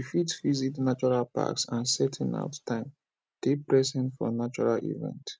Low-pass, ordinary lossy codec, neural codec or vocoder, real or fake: none; none; none; real